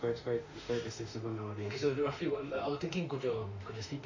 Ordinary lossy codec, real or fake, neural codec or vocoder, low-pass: AAC, 48 kbps; fake; autoencoder, 48 kHz, 32 numbers a frame, DAC-VAE, trained on Japanese speech; 7.2 kHz